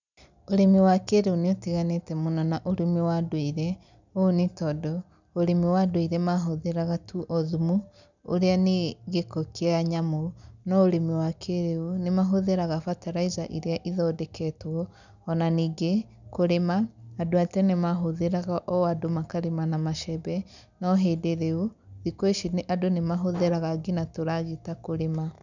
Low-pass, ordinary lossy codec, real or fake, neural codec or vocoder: 7.2 kHz; none; real; none